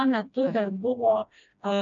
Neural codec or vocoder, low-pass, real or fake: codec, 16 kHz, 1 kbps, FreqCodec, smaller model; 7.2 kHz; fake